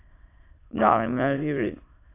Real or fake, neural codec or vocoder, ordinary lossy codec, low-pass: fake; autoencoder, 22.05 kHz, a latent of 192 numbers a frame, VITS, trained on many speakers; AAC, 24 kbps; 3.6 kHz